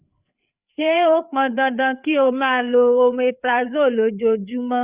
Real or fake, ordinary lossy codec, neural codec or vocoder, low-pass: fake; Opus, 64 kbps; codec, 16 kHz, 4 kbps, FreqCodec, larger model; 3.6 kHz